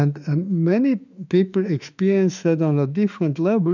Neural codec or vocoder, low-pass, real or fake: autoencoder, 48 kHz, 32 numbers a frame, DAC-VAE, trained on Japanese speech; 7.2 kHz; fake